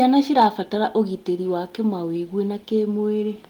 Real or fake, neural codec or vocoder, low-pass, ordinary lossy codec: real; none; 19.8 kHz; Opus, 24 kbps